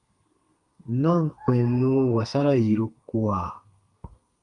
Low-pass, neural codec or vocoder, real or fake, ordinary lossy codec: 10.8 kHz; codec, 32 kHz, 1.9 kbps, SNAC; fake; Opus, 32 kbps